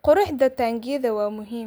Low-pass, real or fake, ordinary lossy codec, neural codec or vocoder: none; real; none; none